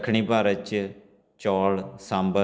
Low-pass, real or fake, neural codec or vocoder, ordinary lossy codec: none; real; none; none